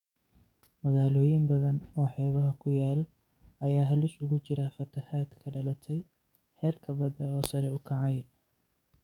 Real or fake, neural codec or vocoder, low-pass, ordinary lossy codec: fake; codec, 44.1 kHz, 7.8 kbps, DAC; 19.8 kHz; none